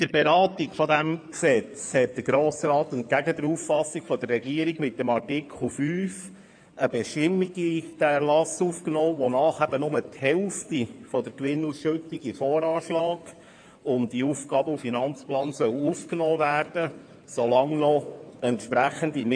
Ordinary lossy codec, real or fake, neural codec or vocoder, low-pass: AAC, 64 kbps; fake; codec, 16 kHz in and 24 kHz out, 2.2 kbps, FireRedTTS-2 codec; 9.9 kHz